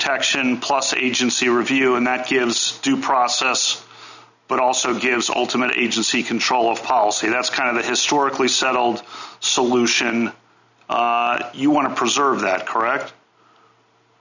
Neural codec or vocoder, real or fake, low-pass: none; real; 7.2 kHz